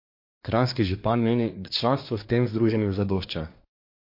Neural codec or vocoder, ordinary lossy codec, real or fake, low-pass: codec, 24 kHz, 1 kbps, SNAC; MP3, 32 kbps; fake; 5.4 kHz